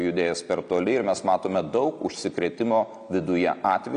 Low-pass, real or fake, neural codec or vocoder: 9.9 kHz; real; none